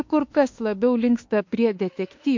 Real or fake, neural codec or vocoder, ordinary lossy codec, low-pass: fake; autoencoder, 48 kHz, 32 numbers a frame, DAC-VAE, trained on Japanese speech; MP3, 48 kbps; 7.2 kHz